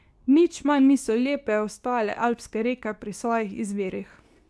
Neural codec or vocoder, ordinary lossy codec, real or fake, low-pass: codec, 24 kHz, 0.9 kbps, WavTokenizer, medium speech release version 2; none; fake; none